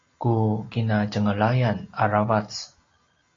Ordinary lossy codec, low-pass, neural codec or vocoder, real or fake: AAC, 32 kbps; 7.2 kHz; none; real